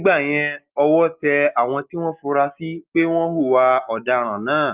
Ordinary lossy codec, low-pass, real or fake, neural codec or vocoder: Opus, 24 kbps; 3.6 kHz; real; none